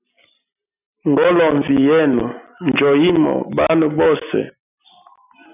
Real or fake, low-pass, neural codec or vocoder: real; 3.6 kHz; none